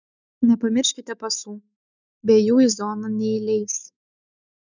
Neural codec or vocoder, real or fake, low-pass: autoencoder, 48 kHz, 128 numbers a frame, DAC-VAE, trained on Japanese speech; fake; 7.2 kHz